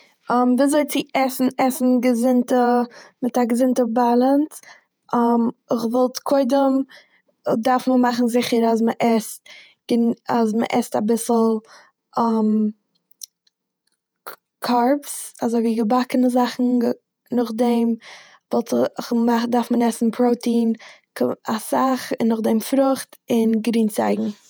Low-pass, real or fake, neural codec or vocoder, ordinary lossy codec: none; fake; vocoder, 48 kHz, 128 mel bands, Vocos; none